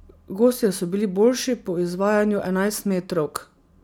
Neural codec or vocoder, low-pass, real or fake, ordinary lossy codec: none; none; real; none